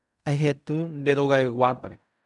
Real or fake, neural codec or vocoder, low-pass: fake; codec, 16 kHz in and 24 kHz out, 0.4 kbps, LongCat-Audio-Codec, fine tuned four codebook decoder; 10.8 kHz